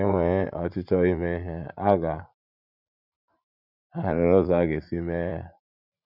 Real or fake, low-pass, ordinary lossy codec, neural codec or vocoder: fake; 5.4 kHz; none; vocoder, 44.1 kHz, 80 mel bands, Vocos